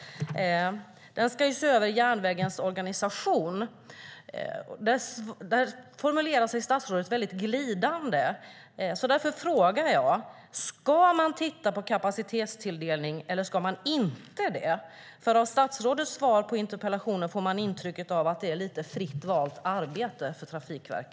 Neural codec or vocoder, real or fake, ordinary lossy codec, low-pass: none; real; none; none